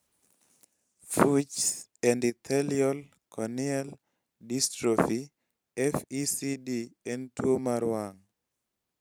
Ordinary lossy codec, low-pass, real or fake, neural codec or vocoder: none; none; fake; vocoder, 44.1 kHz, 128 mel bands every 512 samples, BigVGAN v2